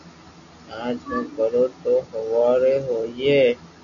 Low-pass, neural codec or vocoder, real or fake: 7.2 kHz; none; real